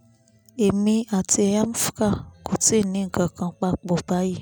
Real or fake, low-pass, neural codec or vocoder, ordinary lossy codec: real; none; none; none